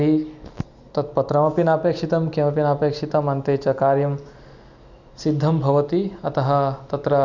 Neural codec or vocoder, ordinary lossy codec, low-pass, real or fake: none; none; 7.2 kHz; real